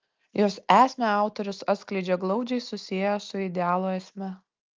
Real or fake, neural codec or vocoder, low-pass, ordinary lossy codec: real; none; 7.2 kHz; Opus, 32 kbps